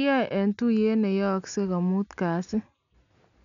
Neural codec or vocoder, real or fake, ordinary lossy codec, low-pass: none; real; none; 7.2 kHz